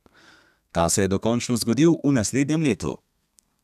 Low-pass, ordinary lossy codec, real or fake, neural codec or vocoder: 14.4 kHz; none; fake; codec, 32 kHz, 1.9 kbps, SNAC